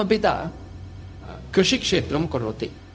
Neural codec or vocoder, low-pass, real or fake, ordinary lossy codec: codec, 16 kHz, 0.4 kbps, LongCat-Audio-Codec; none; fake; none